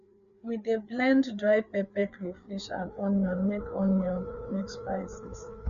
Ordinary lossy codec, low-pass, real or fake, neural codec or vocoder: none; 7.2 kHz; fake; codec, 16 kHz, 4 kbps, FreqCodec, larger model